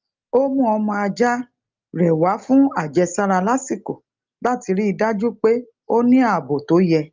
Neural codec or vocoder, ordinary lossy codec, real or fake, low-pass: none; Opus, 24 kbps; real; 7.2 kHz